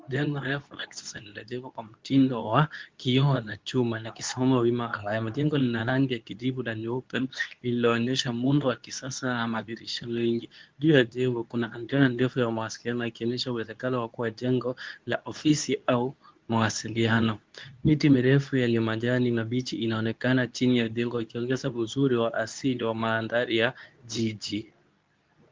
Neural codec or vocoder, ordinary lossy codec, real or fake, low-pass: codec, 24 kHz, 0.9 kbps, WavTokenizer, medium speech release version 2; Opus, 32 kbps; fake; 7.2 kHz